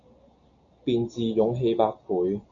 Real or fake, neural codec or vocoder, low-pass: real; none; 7.2 kHz